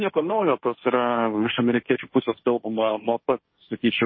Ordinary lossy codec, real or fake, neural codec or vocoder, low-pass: MP3, 24 kbps; fake; codec, 16 kHz, 1.1 kbps, Voila-Tokenizer; 7.2 kHz